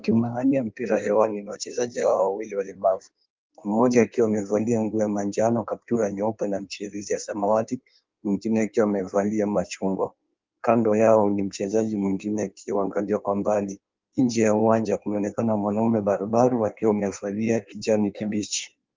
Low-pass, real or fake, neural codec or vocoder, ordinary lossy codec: 7.2 kHz; fake; codec, 16 kHz in and 24 kHz out, 1.1 kbps, FireRedTTS-2 codec; Opus, 32 kbps